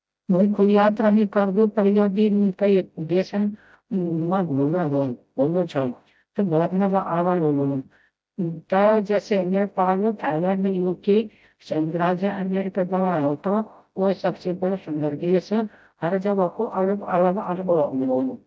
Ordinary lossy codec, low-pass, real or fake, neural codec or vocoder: none; none; fake; codec, 16 kHz, 0.5 kbps, FreqCodec, smaller model